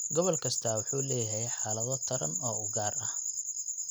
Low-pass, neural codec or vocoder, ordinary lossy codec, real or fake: none; none; none; real